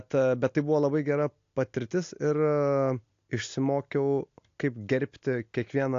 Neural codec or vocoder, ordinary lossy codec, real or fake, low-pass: none; AAC, 48 kbps; real; 7.2 kHz